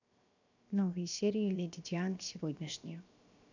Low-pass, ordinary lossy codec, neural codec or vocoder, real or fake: 7.2 kHz; none; codec, 16 kHz, 0.7 kbps, FocalCodec; fake